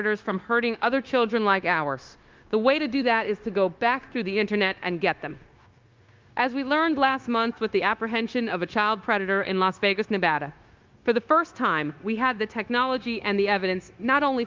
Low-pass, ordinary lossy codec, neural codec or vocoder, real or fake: 7.2 kHz; Opus, 32 kbps; codec, 16 kHz, 0.9 kbps, LongCat-Audio-Codec; fake